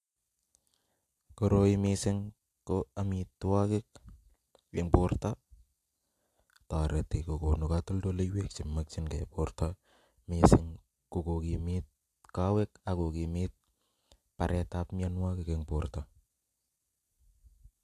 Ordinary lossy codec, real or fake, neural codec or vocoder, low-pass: AAC, 64 kbps; real; none; 14.4 kHz